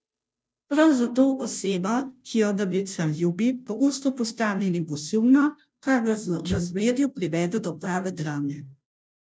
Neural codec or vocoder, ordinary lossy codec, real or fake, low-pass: codec, 16 kHz, 0.5 kbps, FunCodec, trained on Chinese and English, 25 frames a second; none; fake; none